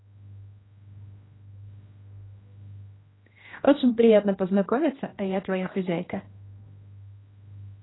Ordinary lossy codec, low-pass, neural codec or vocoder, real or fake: AAC, 16 kbps; 7.2 kHz; codec, 16 kHz, 1 kbps, X-Codec, HuBERT features, trained on general audio; fake